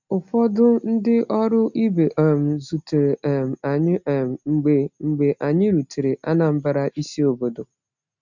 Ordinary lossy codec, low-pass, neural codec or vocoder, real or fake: none; 7.2 kHz; none; real